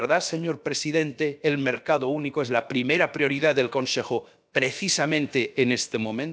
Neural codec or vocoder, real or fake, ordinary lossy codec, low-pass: codec, 16 kHz, about 1 kbps, DyCAST, with the encoder's durations; fake; none; none